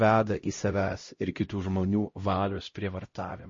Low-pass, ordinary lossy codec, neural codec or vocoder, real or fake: 7.2 kHz; MP3, 32 kbps; codec, 16 kHz, 0.5 kbps, X-Codec, HuBERT features, trained on LibriSpeech; fake